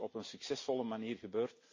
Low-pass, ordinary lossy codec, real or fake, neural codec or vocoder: 7.2 kHz; MP3, 32 kbps; real; none